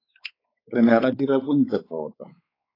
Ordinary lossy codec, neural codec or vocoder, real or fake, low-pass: AAC, 24 kbps; codec, 16 kHz, 4 kbps, X-Codec, WavLM features, trained on Multilingual LibriSpeech; fake; 5.4 kHz